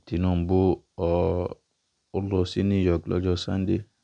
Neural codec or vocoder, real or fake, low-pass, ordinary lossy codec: none; real; 9.9 kHz; none